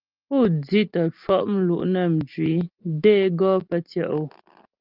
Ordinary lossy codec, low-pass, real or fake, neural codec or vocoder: Opus, 32 kbps; 5.4 kHz; real; none